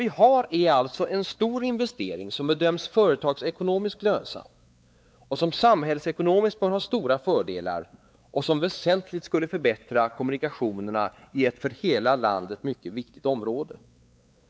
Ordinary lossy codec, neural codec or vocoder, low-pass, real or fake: none; codec, 16 kHz, 4 kbps, X-Codec, WavLM features, trained on Multilingual LibriSpeech; none; fake